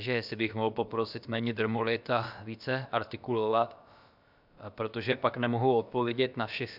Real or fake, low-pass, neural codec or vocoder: fake; 5.4 kHz; codec, 16 kHz, about 1 kbps, DyCAST, with the encoder's durations